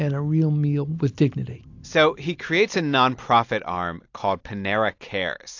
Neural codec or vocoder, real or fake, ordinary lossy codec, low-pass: none; real; AAC, 48 kbps; 7.2 kHz